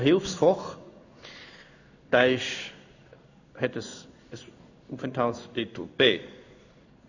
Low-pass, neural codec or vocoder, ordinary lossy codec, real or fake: 7.2 kHz; codec, 16 kHz in and 24 kHz out, 1 kbps, XY-Tokenizer; none; fake